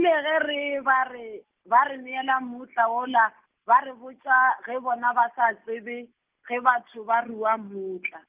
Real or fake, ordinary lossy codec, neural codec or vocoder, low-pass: real; Opus, 32 kbps; none; 3.6 kHz